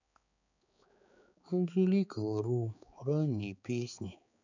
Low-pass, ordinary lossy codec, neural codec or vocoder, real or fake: 7.2 kHz; none; codec, 16 kHz, 4 kbps, X-Codec, HuBERT features, trained on balanced general audio; fake